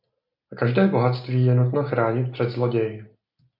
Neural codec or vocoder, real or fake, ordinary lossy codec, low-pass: none; real; MP3, 48 kbps; 5.4 kHz